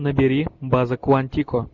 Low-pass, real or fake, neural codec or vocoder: 7.2 kHz; real; none